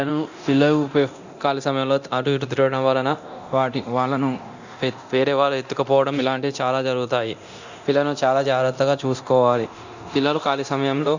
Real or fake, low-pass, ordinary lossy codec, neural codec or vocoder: fake; 7.2 kHz; Opus, 64 kbps; codec, 24 kHz, 0.9 kbps, DualCodec